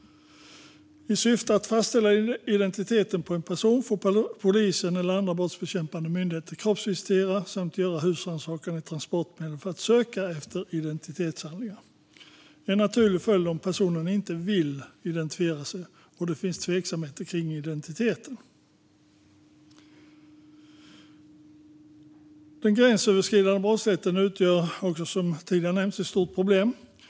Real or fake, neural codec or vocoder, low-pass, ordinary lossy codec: real; none; none; none